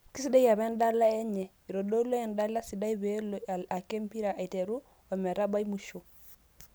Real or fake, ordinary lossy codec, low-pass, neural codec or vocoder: real; none; none; none